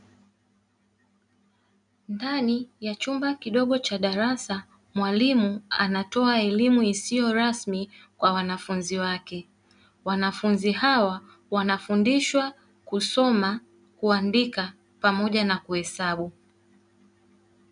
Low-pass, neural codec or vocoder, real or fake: 9.9 kHz; none; real